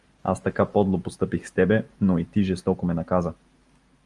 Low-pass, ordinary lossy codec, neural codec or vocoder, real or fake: 10.8 kHz; Opus, 32 kbps; none; real